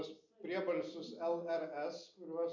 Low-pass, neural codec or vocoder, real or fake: 7.2 kHz; none; real